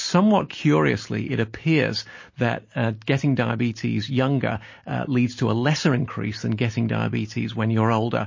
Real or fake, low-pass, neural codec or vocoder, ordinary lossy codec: real; 7.2 kHz; none; MP3, 32 kbps